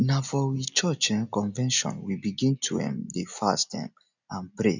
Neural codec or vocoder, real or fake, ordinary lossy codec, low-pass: none; real; none; 7.2 kHz